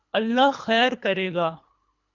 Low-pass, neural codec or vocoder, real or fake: 7.2 kHz; codec, 24 kHz, 3 kbps, HILCodec; fake